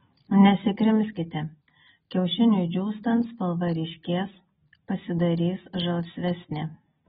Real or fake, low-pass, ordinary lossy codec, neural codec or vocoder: real; 19.8 kHz; AAC, 16 kbps; none